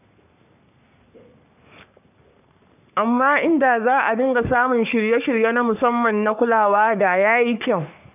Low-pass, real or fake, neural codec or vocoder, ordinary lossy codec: 3.6 kHz; fake; codec, 44.1 kHz, 3.4 kbps, Pupu-Codec; none